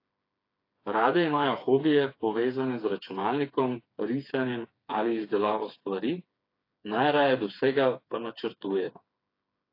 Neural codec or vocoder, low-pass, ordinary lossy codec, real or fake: codec, 16 kHz, 4 kbps, FreqCodec, smaller model; 5.4 kHz; AAC, 32 kbps; fake